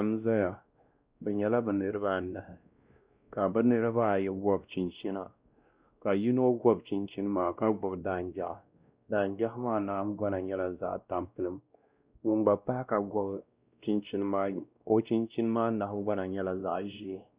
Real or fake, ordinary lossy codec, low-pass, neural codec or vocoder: fake; Opus, 64 kbps; 3.6 kHz; codec, 16 kHz, 1 kbps, X-Codec, WavLM features, trained on Multilingual LibriSpeech